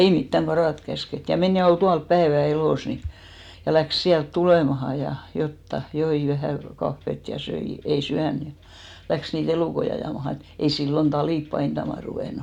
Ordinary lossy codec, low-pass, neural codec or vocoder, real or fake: none; 19.8 kHz; none; real